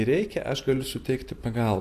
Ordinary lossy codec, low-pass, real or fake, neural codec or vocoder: AAC, 64 kbps; 14.4 kHz; real; none